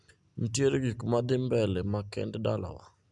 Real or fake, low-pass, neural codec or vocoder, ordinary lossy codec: real; 10.8 kHz; none; none